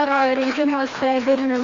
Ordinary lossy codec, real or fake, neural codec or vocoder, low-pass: Opus, 32 kbps; fake; codec, 16 kHz, 1 kbps, FreqCodec, larger model; 7.2 kHz